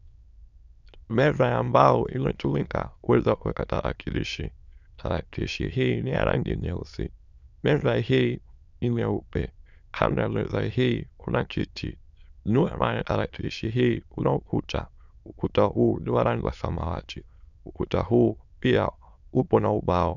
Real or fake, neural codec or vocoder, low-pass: fake; autoencoder, 22.05 kHz, a latent of 192 numbers a frame, VITS, trained on many speakers; 7.2 kHz